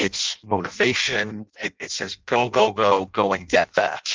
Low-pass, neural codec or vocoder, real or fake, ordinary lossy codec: 7.2 kHz; codec, 16 kHz in and 24 kHz out, 0.6 kbps, FireRedTTS-2 codec; fake; Opus, 24 kbps